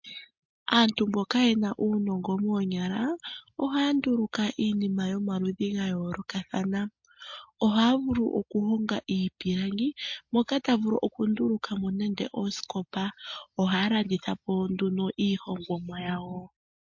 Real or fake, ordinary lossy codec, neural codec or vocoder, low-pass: real; MP3, 48 kbps; none; 7.2 kHz